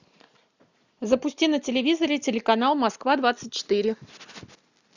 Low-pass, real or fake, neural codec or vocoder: 7.2 kHz; real; none